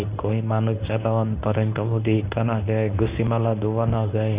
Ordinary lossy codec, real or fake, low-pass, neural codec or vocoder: Opus, 64 kbps; fake; 3.6 kHz; codec, 24 kHz, 0.9 kbps, WavTokenizer, medium speech release version 1